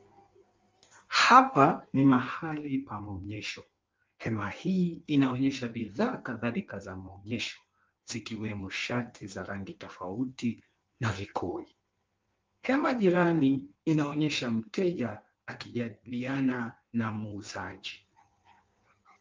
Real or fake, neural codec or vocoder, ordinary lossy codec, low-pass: fake; codec, 16 kHz in and 24 kHz out, 1.1 kbps, FireRedTTS-2 codec; Opus, 32 kbps; 7.2 kHz